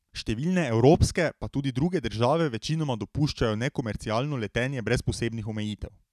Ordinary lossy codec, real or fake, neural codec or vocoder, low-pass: none; real; none; 14.4 kHz